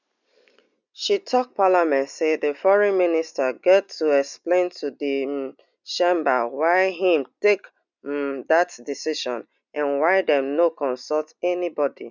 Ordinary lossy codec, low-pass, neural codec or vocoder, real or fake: none; 7.2 kHz; none; real